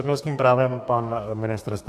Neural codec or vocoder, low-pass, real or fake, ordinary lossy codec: codec, 32 kHz, 1.9 kbps, SNAC; 14.4 kHz; fake; AAC, 64 kbps